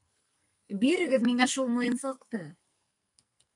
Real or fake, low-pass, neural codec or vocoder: fake; 10.8 kHz; codec, 44.1 kHz, 2.6 kbps, SNAC